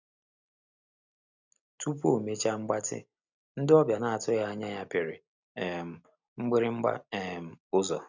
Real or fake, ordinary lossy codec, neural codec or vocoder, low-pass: real; none; none; 7.2 kHz